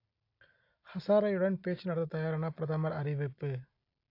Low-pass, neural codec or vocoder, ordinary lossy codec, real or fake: 5.4 kHz; none; AAC, 32 kbps; real